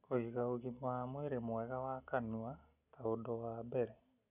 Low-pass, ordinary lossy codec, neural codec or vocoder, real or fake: 3.6 kHz; none; none; real